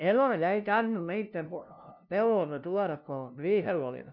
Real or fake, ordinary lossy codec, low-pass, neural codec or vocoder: fake; none; 5.4 kHz; codec, 16 kHz, 0.5 kbps, FunCodec, trained on LibriTTS, 25 frames a second